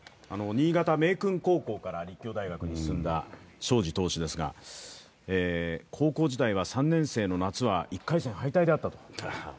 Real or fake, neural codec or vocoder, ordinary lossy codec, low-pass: real; none; none; none